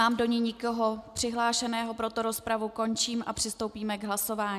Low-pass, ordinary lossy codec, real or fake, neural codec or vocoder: 14.4 kHz; MP3, 96 kbps; real; none